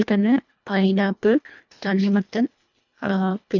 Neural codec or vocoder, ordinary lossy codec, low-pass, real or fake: codec, 24 kHz, 1.5 kbps, HILCodec; none; 7.2 kHz; fake